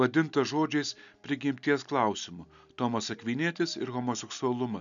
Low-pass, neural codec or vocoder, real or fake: 7.2 kHz; none; real